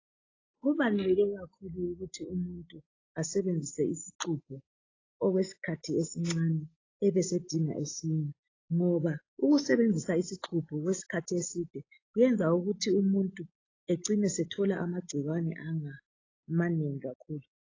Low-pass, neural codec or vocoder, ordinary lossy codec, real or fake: 7.2 kHz; none; AAC, 32 kbps; real